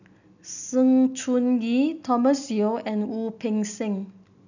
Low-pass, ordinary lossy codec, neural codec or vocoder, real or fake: 7.2 kHz; none; none; real